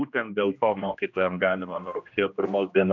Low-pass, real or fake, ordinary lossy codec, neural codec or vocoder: 7.2 kHz; fake; MP3, 64 kbps; codec, 16 kHz, 1 kbps, X-Codec, HuBERT features, trained on general audio